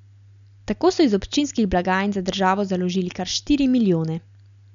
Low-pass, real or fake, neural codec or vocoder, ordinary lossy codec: 7.2 kHz; real; none; none